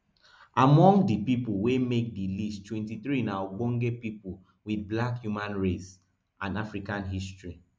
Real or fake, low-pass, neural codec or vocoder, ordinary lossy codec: real; none; none; none